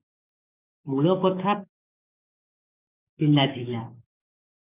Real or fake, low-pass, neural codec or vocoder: fake; 3.6 kHz; codec, 44.1 kHz, 7.8 kbps, DAC